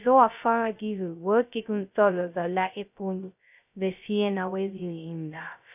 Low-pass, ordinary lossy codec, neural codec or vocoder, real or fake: 3.6 kHz; none; codec, 16 kHz, 0.2 kbps, FocalCodec; fake